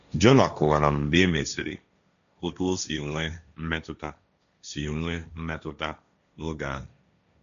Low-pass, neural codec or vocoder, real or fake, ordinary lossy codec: 7.2 kHz; codec, 16 kHz, 1.1 kbps, Voila-Tokenizer; fake; none